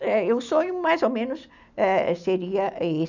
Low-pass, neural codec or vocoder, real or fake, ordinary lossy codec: 7.2 kHz; none; real; none